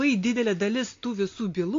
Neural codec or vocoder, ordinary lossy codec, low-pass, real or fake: none; AAC, 48 kbps; 7.2 kHz; real